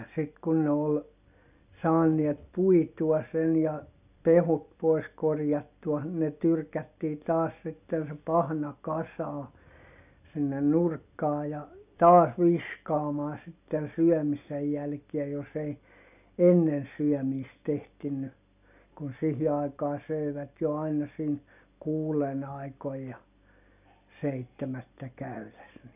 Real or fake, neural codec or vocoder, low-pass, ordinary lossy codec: real; none; 3.6 kHz; none